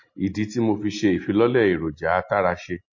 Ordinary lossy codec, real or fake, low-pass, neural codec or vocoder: MP3, 32 kbps; real; 7.2 kHz; none